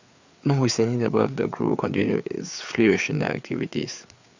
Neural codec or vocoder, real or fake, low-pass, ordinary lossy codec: codec, 16 kHz, 4 kbps, FreqCodec, larger model; fake; 7.2 kHz; Opus, 64 kbps